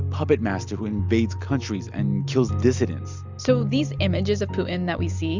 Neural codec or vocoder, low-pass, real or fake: none; 7.2 kHz; real